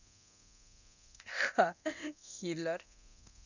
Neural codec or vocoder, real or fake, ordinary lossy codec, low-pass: codec, 24 kHz, 0.9 kbps, DualCodec; fake; none; 7.2 kHz